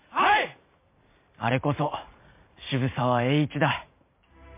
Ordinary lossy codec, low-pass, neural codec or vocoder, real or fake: MP3, 24 kbps; 3.6 kHz; vocoder, 44.1 kHz, 128 mel bands every 512 samples, BigVGAN v2; fake